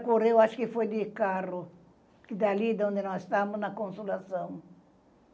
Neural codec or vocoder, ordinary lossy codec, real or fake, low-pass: none; none; real; none